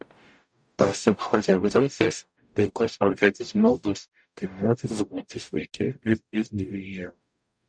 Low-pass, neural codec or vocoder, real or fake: 9.9 kHz; codec, 44.1 kHz, 0.9 kbps, DAC; fake